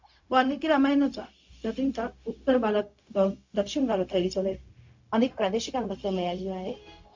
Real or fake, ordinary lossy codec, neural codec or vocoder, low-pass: fake; MP3, 48 kbps; codec, 16 kHz, 0.4 kbps, LongCat-Audio-Codec; 7.2 kHz